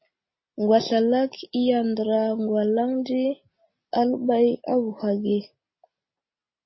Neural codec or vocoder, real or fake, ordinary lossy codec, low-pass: none; real; MP3, 24 kbps; 7.2 kHz